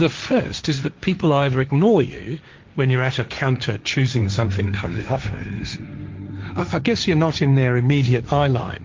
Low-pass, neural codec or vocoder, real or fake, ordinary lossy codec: 7.2 kHz; codec, 16 kHz, 1.1 kbps, Voila-Tokenizer; fake; Opus, 24 kbps